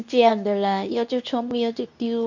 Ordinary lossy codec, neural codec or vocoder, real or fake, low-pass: none; codec, 24 kHz, 0.9 kbps, WavTokenizer, medium speech release version 2; fake; 7.2 kHz